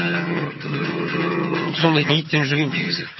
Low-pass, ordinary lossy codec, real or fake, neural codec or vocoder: 7.2 kHz; MP3, 24 kbps; fake; vocoder, 22.05 kHz, 80 mel bands, HiFi-GAN